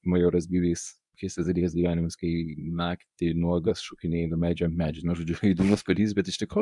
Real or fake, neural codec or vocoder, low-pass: fake; codec, 24 kHz, 0.9 kbps, WavTokenizer, medium speech release version 1; 10.8 kHz